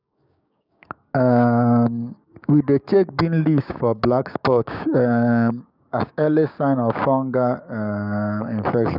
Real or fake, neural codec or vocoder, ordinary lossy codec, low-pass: fake; codec, 16 kHz, 6 kbps, DAC; none; 5.4 kHz